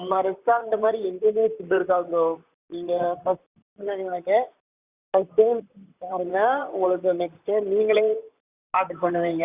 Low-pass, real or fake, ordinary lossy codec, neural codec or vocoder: 3.6 kHz; fake; Opus, 64 kbps; vocoder, 44.1 kHz, 128 mel bands, Pupu-Vocoder